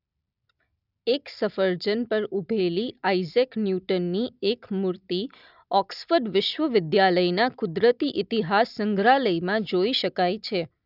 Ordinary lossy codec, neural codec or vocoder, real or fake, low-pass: none; none; real; 5.4 kHz